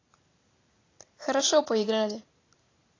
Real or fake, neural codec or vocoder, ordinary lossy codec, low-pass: real; none; AAC, 32 kbps; 7.2 kHz